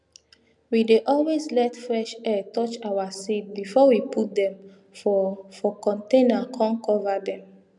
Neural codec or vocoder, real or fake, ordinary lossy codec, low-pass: none; real; none; 10.8 kHz